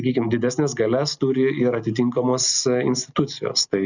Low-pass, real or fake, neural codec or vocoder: 7.2 kHz; real; none